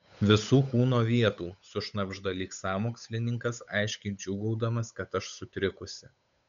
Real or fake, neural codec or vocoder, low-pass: fake; codec, 16 kHz, 8 kbps, FunCodec, trained on Chinese and English, 25 frames a second; 7.2 kHz